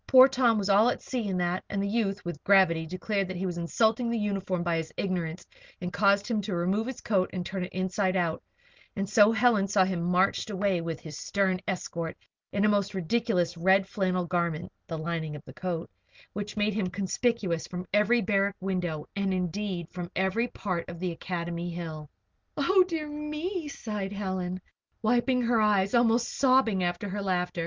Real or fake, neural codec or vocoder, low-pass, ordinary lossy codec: real; none; 7.2 kHz; Opus, 24 kbps